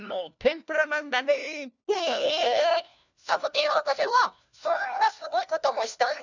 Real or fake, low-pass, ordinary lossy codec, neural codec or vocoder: fake; 7.2 kHz; none; codec, 16 kHz, 1 kbps, FunCodec, trained on LibriTTS, 50 frames a second